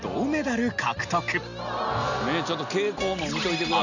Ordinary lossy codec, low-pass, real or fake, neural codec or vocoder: none; 7.2 kHz; real; none